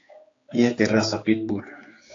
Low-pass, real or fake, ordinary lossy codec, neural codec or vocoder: 7.2 kHz; fake; AAC, 32 kbps; codec, 16 kHz, 4 kbps, X-Codec, HuBERT features, trained on general audio